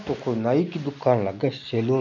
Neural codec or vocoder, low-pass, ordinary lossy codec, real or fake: none; 7.2 kHz; none; real